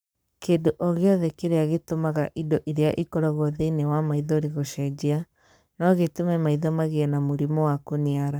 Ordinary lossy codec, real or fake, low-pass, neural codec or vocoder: none; fake; none; codec, 44.1 kHz, 7.8 kbps, Pupu-Codec